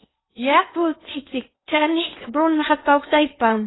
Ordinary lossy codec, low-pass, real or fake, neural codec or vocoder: AAC, 16 kbps; 7.2 kHz; fake; codec, 16 kHz in and 24 kHz out, 0.8 kbps, FocalCodec, streaming, 65536 codes